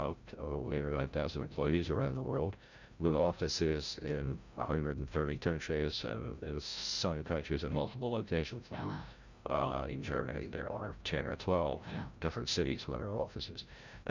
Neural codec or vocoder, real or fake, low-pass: codec, 16 kHz, 0.5 kbps, FreqCodec, larger model; fake; 7.2 kHz